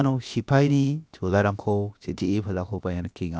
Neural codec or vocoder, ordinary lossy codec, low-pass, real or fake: codec, 16 kHz, about 1 kbps, DyCAST, with the encoder's durations; none; none; fake